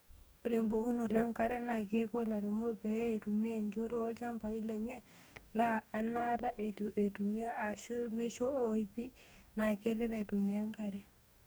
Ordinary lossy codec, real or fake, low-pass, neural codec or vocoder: none; fake; none; codec, 44.1 kHz, 2.6 kbps, DAC